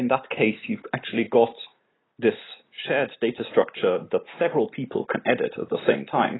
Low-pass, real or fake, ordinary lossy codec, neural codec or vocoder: 7.2 kHz; real; AAC, 16 kbps; none